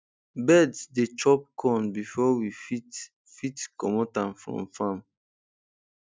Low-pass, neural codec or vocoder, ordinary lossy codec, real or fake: none; none; none; real